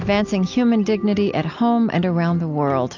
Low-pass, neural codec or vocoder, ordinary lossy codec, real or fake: 7.2 kHz; none; AAC, 48 kbps; real